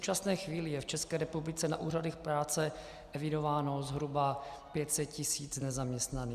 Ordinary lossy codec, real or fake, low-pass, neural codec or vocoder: Opus, 64 kbps; real; 14.4 kHz; none